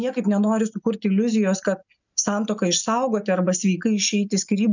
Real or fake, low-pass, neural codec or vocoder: real; 7.2 kHz; none